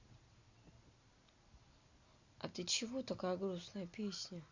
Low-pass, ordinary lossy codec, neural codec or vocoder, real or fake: 7.2 kHz; Opus, 64 kbps; none; real